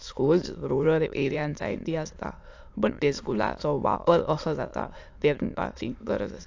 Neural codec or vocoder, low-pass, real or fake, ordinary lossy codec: autoencoder, 22.05 kHz, a latent of 192 numbers a frame, VITS, trained on many speakers; 7.2 kHz; fake; AAC, 48 kbps